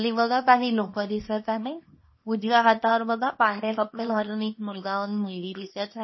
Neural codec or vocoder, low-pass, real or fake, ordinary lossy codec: codec, 24 kHz, 0.9 kbps, WavTokenizer, small release; 7.2 kHz; fake; MP3, 24 kbps